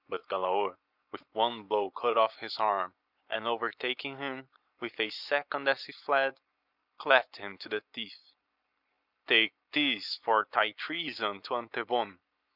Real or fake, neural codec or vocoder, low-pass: real; none; 5.4 kHz